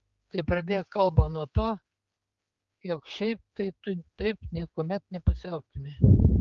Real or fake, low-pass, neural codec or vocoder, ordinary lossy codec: fake; 7.2 kHz; codec, 16 kHz, 4 kbps, X-Codec, HuBERT features, trained on general audio; Opus, 32 kbps